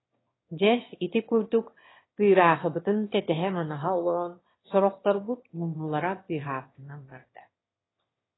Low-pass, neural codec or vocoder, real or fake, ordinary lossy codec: 7.2 kHz; autoencoder, 22.05 kHz, a latent of 192 numbers a frame, VITS, trained on one speaker; fake; AAC, 16 kbps